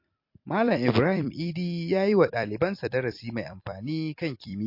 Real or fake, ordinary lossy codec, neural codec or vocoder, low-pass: fake; MP3, 32 kbps; vocoder, 44.1 kHz, 128 mel bands every 256 samples, BigVGAN v2; 5.4 kHz